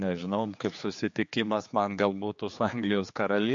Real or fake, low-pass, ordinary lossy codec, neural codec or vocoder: fake; 7.2 kHz; MP3, 48 kbps; codec, 16 kHz, 4 kbps, X-Codec, HuBERT features, trained on general audio